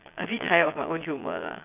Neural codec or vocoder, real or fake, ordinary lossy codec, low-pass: vocoder, 22.05 kHz, 80 mel bands, Vocos; fake; none; 3.6 kHz